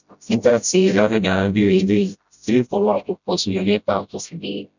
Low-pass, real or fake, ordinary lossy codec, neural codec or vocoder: 7.2 kHz; fake; none; codec, 16 kHz, 0.5 kbps, FreqCodec, smaller model